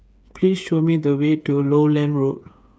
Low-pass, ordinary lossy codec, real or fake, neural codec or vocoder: none; none; fake; codec, 16 kHz, 8 kbps, FreqCodec, smaller model